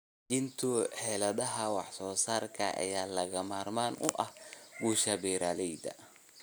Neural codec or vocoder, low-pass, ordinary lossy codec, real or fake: none; none; none; real